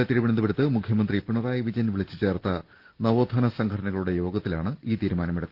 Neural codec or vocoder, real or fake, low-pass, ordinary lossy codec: none; real; 5.4 kHz; Opus, 16 kbps